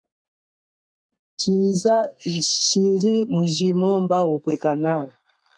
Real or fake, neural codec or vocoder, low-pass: fake; codec, 32 kHz, 1.9 kbps, SNAC; 9.9 kHz